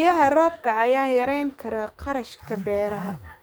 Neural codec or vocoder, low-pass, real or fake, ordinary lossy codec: codec, 44.1 kHz, 2.6 kbps, SNAC; none; fake; none